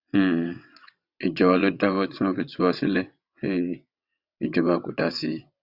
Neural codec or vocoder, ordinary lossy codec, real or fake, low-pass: vocoder, 22.05 kHz, 80 mel bands, WaveNeXt; Opus, 64 kbps; fake; 5.4 kHz